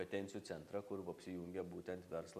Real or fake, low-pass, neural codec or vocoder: real; 14.4 kHz; none